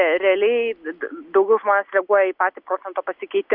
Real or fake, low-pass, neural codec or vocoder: real; 5.4 kHz; none